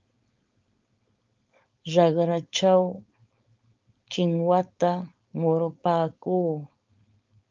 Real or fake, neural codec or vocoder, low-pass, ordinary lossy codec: fake; codec, 16 kHz, 4.8 kbps, FACodec; 7.2 kHz; Opus, 24 kbps